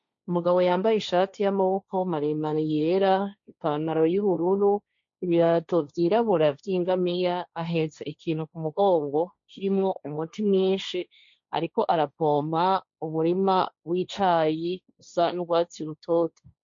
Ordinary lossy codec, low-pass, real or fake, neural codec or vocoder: MP3, 48 kbps; 7.2 kHz; fake; codec, 16 kHz, 1.1 kbps, Voila-Tokenizer